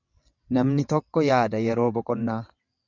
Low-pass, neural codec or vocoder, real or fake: 7.2 kHz; vocoder, 22.05 kHz, 80 mel bands, WaveNeXt; fake